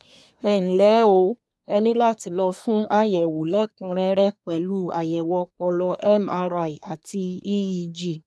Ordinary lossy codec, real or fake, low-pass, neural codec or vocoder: none; fake; none; codec, 24 kHz, 1 kbps, SNAC